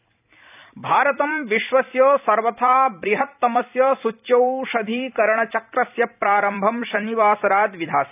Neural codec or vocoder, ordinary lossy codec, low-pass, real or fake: vocoder, 44.1 kHz, 128 mel bands every 256 samples, BigVGAN v2; none; 3.6 kHz; fake